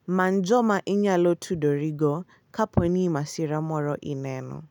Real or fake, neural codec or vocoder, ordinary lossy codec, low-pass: real; none; none; 19.8 kHz